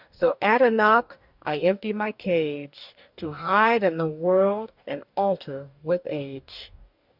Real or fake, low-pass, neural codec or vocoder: fake; 5.4 kHz; codec, 44.1 kHz, 2.6 kbps, DAC